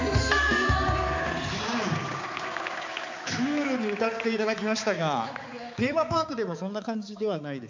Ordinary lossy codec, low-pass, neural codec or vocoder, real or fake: MP3, 64 kbps; 7.2 kHz; codec, 16 kHz, 4 kbps, X-Codec, HuBERT features, trained on balanced general audio; fake